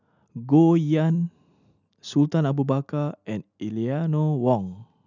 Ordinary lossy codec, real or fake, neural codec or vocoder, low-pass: none; real; none; 7.2 kHz